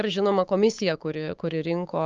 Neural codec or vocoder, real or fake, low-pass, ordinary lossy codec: codec, 16 kHz, 6 kbps, DAC; fake; 7.2 kHz; Opus, 32 kbps